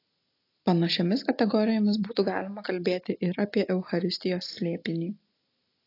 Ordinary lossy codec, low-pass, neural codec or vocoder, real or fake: AAC, 32 kbps; 5.4 kHz; none; real